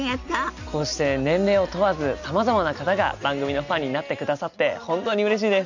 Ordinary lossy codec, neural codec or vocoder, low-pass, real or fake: none; none; 7.2 kHz; real